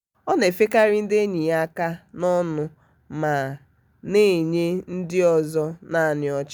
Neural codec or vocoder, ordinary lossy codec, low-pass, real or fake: none; none; 19.8 kHz; real